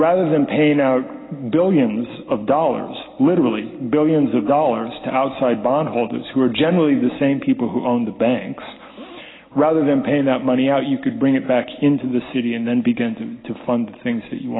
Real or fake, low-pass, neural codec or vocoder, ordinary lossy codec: real; 7.2 kHz; none; AAC, 16 kbps